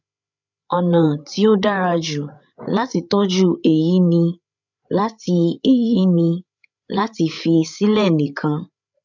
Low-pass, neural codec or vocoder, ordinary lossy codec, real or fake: 7.2 kHz; codec, 16 kHz, 8 kbps, FreqCodec, larger model; none; fake